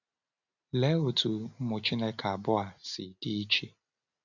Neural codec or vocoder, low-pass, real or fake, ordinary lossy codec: none; 7.2 kHz; real; none